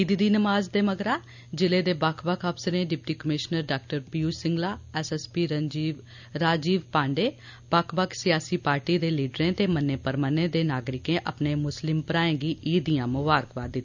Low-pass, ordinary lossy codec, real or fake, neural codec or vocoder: 7.2 kHz; none; real; none